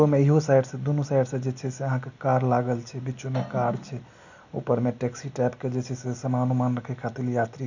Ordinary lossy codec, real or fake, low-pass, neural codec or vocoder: none; real; 7.2 kHz; none